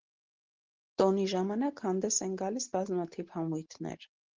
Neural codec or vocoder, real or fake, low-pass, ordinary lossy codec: none; real; 7.2 kHz; Opus, 16 kbps